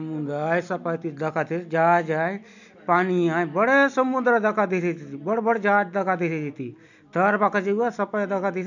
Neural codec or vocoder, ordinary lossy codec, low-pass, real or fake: none; none; 7.2 kHz; real